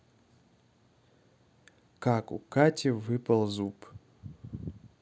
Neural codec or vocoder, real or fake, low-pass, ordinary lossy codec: none; real; none; none